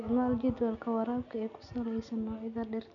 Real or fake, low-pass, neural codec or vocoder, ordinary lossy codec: real; 7.2 kHz; none; none